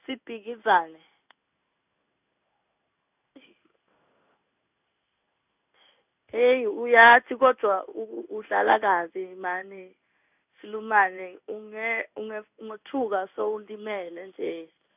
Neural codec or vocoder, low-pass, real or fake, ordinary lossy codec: codec, 16 kHz in and 24 kHz out, 1 kbps, XY-Tokenizer; 3.6 kHz; fake; none